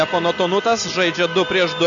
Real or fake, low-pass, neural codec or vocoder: real; 7.2 kHz; none